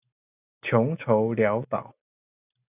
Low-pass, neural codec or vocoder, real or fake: 3.6 kHz; none; real